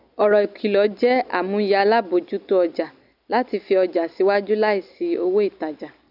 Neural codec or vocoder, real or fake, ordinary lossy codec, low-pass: none; real; none; 5.4 kHz